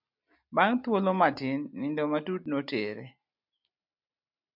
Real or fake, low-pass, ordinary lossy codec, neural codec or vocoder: real; 5.4 kHz; MP3, 48 kbps; none